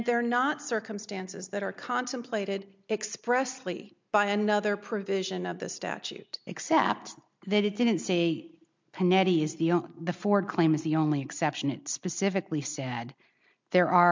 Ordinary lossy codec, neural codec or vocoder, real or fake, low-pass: MP3, 64 kbps; none; real; 7.2 kHz